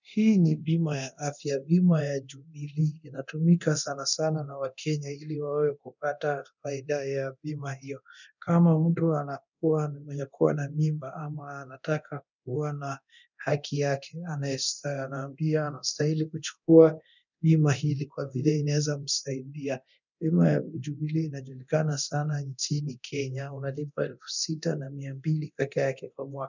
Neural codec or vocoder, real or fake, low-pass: codec, 24 kHz, 0.9 kbps, DualCodec; fake; 7.2 kHz